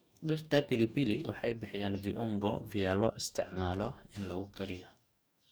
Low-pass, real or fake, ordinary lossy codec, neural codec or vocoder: none; fake; none; codec, 44.1 kHz, 2.6 kbps, DAC